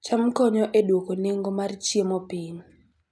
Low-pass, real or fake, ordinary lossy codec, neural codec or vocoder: none; real; none; none